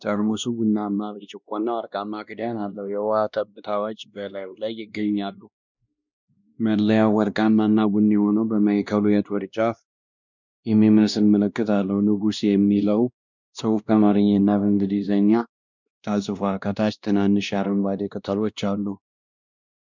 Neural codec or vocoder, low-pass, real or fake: codec, 16 kHz, 1 kbps, X-Codec, WavLM features, trained on Multilingual LibriSpeech; 7.2 kHz; fake